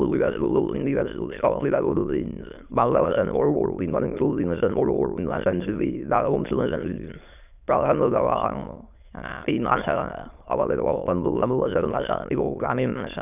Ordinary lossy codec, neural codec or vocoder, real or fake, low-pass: none; autoencoder, 22.05 kHz, a latent of 192 numbers a frame, VITS, trained on many speakers; fake; 3.6 kHz